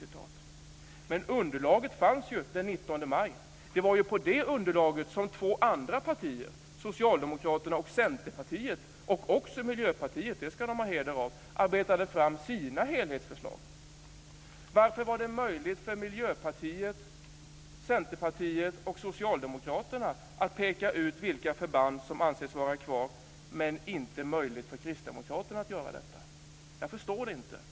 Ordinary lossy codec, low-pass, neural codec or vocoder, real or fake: none; none; none; real